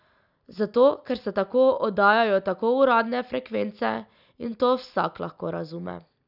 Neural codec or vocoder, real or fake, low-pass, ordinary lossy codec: none; real; 5.4 kHz; none